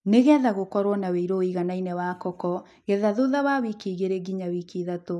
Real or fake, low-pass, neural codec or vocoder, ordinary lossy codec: real; none; none; none